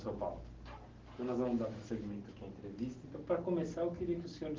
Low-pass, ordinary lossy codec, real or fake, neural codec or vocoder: 7.2 kHz; Opus, 16 kbps; real; none